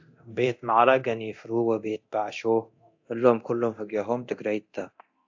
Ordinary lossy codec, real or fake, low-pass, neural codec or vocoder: AAC, 48 kbps; fake; 7.2 kHz; codec, 24 kHz, 0.9 kbps, DualCodec